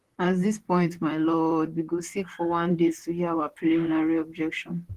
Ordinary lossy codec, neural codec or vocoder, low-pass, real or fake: Opus, 16 kbps; vocoder, 44.1 kHz, 128 mel bands, Pupu-Vocoder; 14.4 kHz; fake